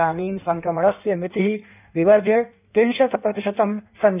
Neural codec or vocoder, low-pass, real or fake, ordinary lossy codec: codec, 16 kHz in and 24 kHz out, 1.1 kbps, FireRedTTS-2 codec; 3.6 kHz; fake; none